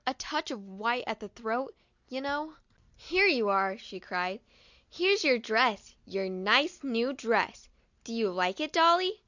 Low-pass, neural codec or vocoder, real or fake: 7.2 kHz; none; real